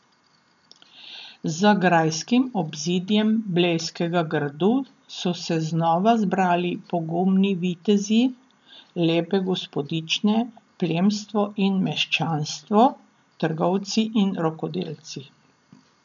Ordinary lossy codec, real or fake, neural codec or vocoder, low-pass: none; real; none; 7.2 kHz